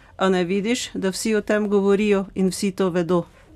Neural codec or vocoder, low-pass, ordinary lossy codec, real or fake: none; 14.4 kHz; none; real